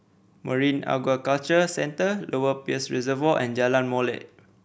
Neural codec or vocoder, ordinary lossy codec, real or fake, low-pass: none; none; real; none